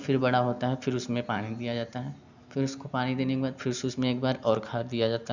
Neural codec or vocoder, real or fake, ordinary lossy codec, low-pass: none; real; none; 7.2 kHz